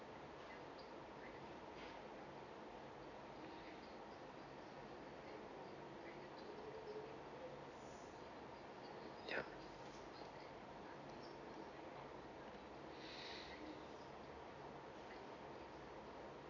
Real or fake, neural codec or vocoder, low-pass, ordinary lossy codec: real; none; 7.2 kHz; none